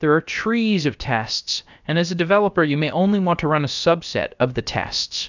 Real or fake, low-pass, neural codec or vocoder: fake; 7.2 kHz; codec, 16 kHz, about 1 kbps, DyCAST, with the encoder's durations